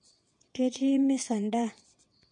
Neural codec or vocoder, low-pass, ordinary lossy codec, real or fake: vocoder, 22.05 kHz, 80 mel bands, WaveNeXt; 9.9 kHz; MP3, 48 kbps; fake